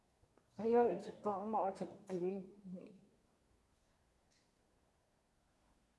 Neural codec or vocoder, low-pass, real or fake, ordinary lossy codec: codec, 24 kHz, 1 kbps, SNAC; none; fake; none